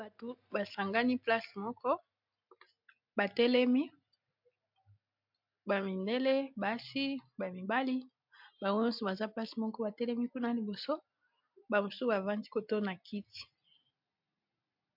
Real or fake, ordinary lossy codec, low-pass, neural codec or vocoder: real; MP3, 48 kbps; 5.4 kHz; none